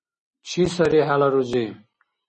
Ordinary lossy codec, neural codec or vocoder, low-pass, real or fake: MP3, 32 kbps; none; 9.9 kHz; real